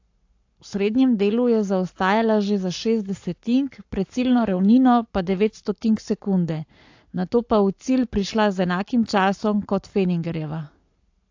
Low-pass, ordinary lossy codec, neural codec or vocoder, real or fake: 7.2 kHz; AAC, 48 kbps; codec, 44.1 kHz, 7.8 kbps, Pupu-Codec; fake